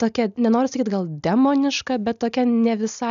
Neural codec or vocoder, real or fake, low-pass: none; real; 7.2 kHz